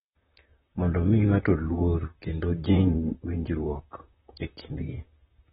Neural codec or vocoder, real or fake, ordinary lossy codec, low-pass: vocoder, 44.1 kHz, 128 mel bands, Pupu-Vocoder; fake; AAC, 16 kbps; 19.8 kHz